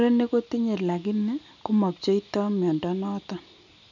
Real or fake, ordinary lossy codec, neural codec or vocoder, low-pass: real; none; none; 7.2 kHz